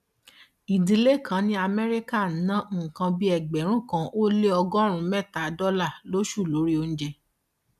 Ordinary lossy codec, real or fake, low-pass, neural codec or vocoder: none; real; 14.4 kHz; none